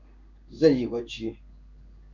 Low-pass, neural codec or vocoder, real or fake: 7.2 kHz; codec, 16 kHz in and 24 kHz out, 1 kbps, XY-Tokenizer; fake